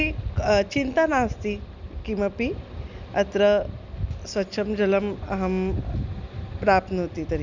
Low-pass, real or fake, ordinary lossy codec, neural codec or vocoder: 7.2 kHz; real; none; none